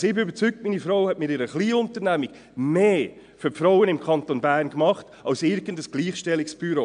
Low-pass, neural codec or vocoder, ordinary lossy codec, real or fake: 9.9 kHz; none; MP3, 64 kbps; real